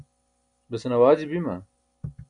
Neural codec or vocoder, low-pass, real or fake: none; 9.9 kHz; real